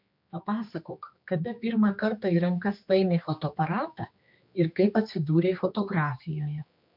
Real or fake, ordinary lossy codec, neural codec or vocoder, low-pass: fake; MP3, 48 kbps; codec, 16 kHz, 2 kbps, X-Codec, HuBERT features, trained on general audio; 5.4 kHz